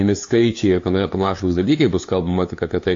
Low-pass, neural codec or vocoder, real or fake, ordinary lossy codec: 7.2 kHz; codec, 16 kHz, 2 kbps, FunCodec, trained on LibriTTS, 25 frames a second; fake; AAC, 48 kbps